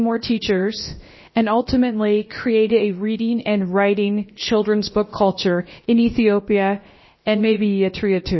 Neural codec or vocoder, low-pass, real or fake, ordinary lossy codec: codec, 16 kHz, about 1 kbps, DyCAST, with the encoder's durations; 7.2 kHz; fake; MP3, 24 kbps